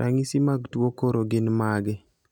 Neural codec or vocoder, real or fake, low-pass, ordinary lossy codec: none; real; 19.8 kHz; none